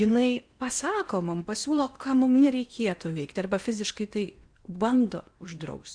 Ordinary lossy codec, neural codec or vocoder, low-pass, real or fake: Opus, 64 kbps; codec, 16 kHz in and 24 kHz out, 0.8 kbps, FocalCodec, streaming, 65536 codes; 9.9 kHz; fake